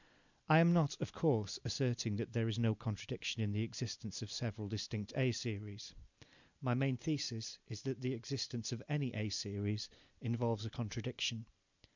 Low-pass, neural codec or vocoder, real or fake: 7.2 kHz; none; real